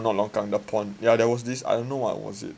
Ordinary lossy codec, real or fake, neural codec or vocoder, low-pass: none; real; none; none